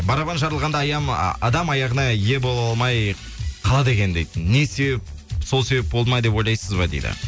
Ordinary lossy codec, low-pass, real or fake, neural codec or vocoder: none; none; real; none